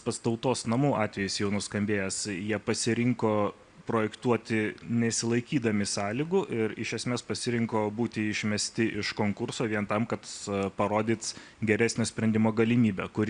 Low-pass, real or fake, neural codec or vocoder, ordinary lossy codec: 9.9 kHz; real; none; Opus, 64 kbps